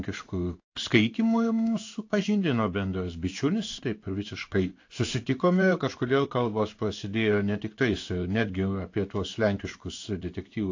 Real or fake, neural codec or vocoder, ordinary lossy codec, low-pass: fake; codec, 16 kHz in and 24 kHz out, 1 kbps, XY-Tokenizer; AAC, 48 kbps; 7.2 kHz